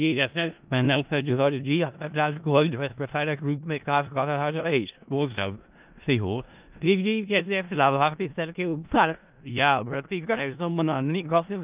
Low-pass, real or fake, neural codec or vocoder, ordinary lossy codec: 3.6 kHz; fake; codec, 16 kHz in and 24 kHz out, 0.4 kbps, LongCat-Audio-Codec, four codebook decoder; Opus, 24 kbps